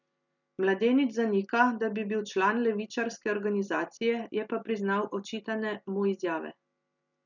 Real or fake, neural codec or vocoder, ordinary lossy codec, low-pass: real; none; none; 7.2 kHz